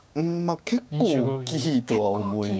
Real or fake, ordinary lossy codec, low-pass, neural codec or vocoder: fake; none; none; codec, 16 kHz, 6 kbps, DAC